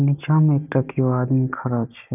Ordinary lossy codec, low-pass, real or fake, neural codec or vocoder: none; 3.6 kHz; real; none